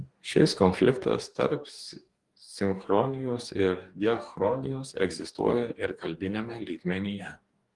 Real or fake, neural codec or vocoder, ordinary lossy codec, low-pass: fake; codec, 44.1 kHz, 2.6 kbps, DAC; Opus, 24 kbps; 10.8 kHz